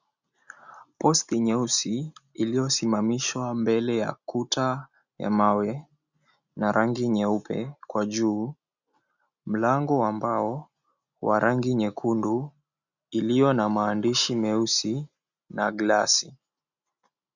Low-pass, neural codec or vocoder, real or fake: 7.2 kHz; none; real